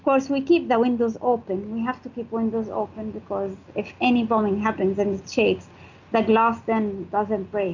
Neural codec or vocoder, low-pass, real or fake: none; 7.2 kHz; real